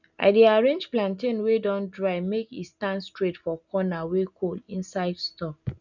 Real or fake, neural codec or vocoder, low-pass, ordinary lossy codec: real; none; 7.2 kHz; none